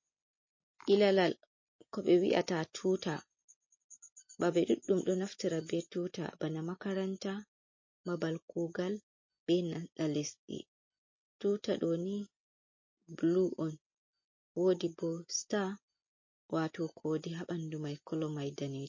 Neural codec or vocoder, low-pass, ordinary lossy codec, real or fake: none; 7.2 kHz; MP3, 32 kbps; real